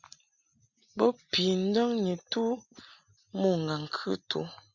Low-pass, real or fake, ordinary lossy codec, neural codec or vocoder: 7.2 kHz; real; Opus, 64 kbps; none